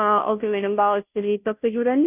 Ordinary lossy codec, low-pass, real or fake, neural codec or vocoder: none; 3.6 kHz; fake; codec, 16 kHz, 0.5 kbps, FunCodec, trained on Chinese and English, 25 frames a second